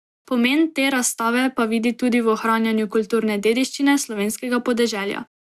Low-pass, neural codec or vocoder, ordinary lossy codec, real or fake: 14.4 kHz; none; Opus, 64 kbps; real